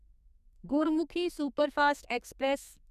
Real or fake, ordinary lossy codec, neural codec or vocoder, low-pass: fake; none; codec, 32 kHz, 1.9 kbps, SNAC; 14.4 kHz